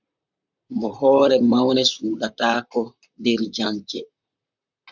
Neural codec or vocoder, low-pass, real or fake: vocoder, 44.1 kHz, 128 mel bands, Pupu-Vocoder; 7.2 kHz; fake